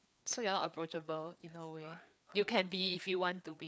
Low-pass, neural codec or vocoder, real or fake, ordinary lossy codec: none; codec, 16 kHz, 4 kbps, FreqCodec, larger model; fake; none